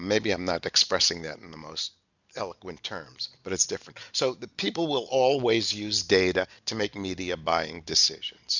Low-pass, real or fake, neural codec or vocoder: 7.2 kHz; real; none